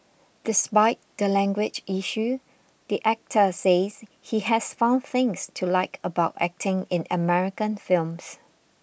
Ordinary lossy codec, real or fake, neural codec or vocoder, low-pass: none; real; none; none